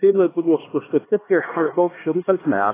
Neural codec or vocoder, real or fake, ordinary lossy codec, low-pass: codec, 16 kHz, 2 kbps, X-Codec, HuBERT features, trained on LibriSpeech; fake; AAC, 16 kbps; 3.6 kHz